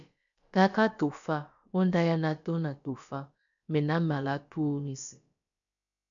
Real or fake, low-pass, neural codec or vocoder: fake; 7.2 kHz; codec, 16 kHz, about 1 kbps, DyCAST, with the encoder's durations